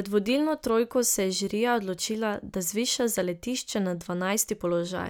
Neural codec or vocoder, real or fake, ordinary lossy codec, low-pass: none; real; none; none